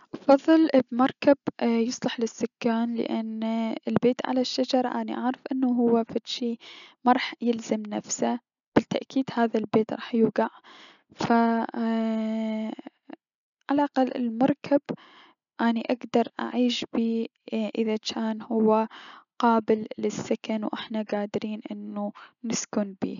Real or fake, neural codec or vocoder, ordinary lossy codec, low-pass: real; none; none; 7.2 kHz